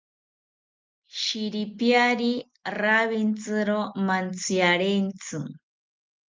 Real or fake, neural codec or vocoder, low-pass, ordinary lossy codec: real; none; 7.2 kHz; Opus, 24 kbps